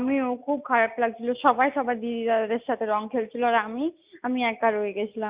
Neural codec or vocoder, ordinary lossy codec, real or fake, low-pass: none; Opus, 64 kbps; real; 3.6 kHz